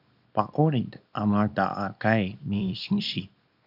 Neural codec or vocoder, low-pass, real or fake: codec, 24 kHz, 0.9 kbps, WavTokenizer, small release; 5.4 kHz; fake